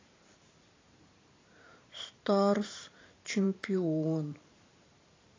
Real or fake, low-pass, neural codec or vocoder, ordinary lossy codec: fake; 7.2 kHz; vocoder, 44.1 kHz, 128 mel bands every 512 samples, BigVGAN v2; AAC, 32 kbps